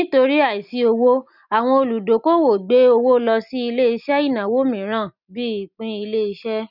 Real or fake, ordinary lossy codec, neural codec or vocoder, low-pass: real; none; none; 5.4 kHz